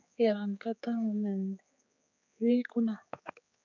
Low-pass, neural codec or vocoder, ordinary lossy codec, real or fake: 7.2 kHz; codec, 16 kHz, 4 kbps, X-Codec, HuBERT features, trained on general audio; MP3, 64 kbps; fake